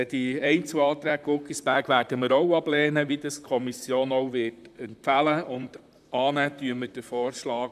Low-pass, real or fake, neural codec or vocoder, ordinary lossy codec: 14.4 kHz; fake; vocoder, 44.1 kHz, 128 mel bands, Pupu-Vocoder; none